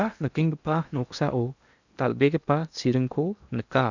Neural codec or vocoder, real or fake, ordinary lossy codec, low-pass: codec, 16 kHz in and 24 kHz out, 0.8 kbps, FocalCodec, streaming, 65536 codes; fake; none; 7.2 kHz